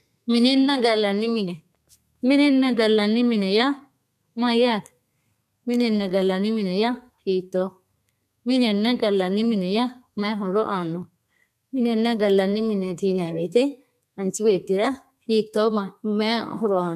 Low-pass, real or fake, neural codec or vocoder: 14.4 kHz; fake; codec, 32 kHz, 1.9 kbps, SNAC